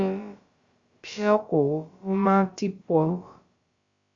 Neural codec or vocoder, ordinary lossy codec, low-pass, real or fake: codec, 16 kHz, about 1 kbps, DyCAST, with the encoder's durations; Opus, 64 kbps; 7.2 kHz; fake